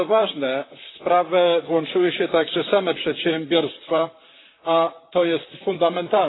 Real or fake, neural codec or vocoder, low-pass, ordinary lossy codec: fake; vocoder, 44.1 kHz, 128 mel bands, Pupu-Vocoder; 7.2 kHz; AAC, 16 kbps